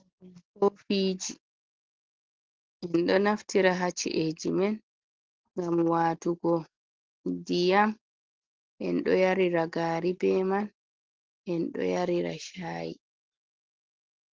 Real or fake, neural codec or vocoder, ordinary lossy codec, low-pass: real; none; Opus, 16 kbps; 7.2 kHz